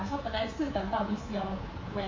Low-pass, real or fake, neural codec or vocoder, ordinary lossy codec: 7.2 kHz; fake; codec, 24 kHz, 3.1 kbps, DualCodec; MP3, 32 kbps